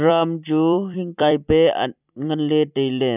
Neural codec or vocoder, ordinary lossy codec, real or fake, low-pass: vocoder, 44.1 kHz, 128 mel bands every 256 samples, BigVGAN v2; none; fake; 3.6 kHz